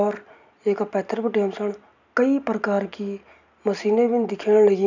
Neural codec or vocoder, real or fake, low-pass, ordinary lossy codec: none; real; 7.2 kHz; none